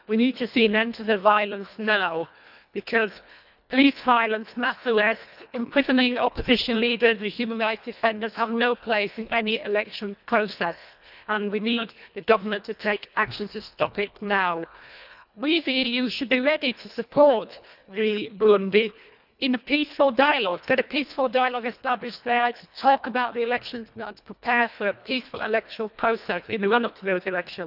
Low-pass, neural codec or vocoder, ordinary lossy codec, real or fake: 5.4 kHz; codec, 24 kHz, 1.5 kbps, HILCodec; none; fake